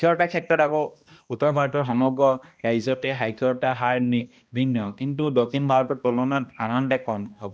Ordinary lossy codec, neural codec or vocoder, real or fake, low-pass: none; codec, 16 kHz, 1 kbps, X-Codec, HuBERT features, trained on balanced general audio; fake; none